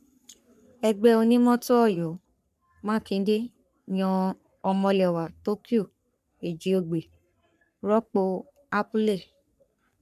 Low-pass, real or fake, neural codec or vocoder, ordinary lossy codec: 14.4 kHz; fake; codec, 44.1 kHz, 3.4 kbps, Pupu-Codec; none